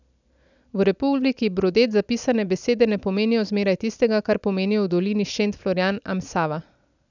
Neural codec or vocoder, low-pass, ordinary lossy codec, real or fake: none; 7.2 kHz; none; real